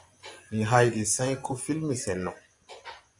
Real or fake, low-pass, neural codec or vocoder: fake; 10.8 kHz; vocoder, 24 kHz, 100 mel bands, Vocos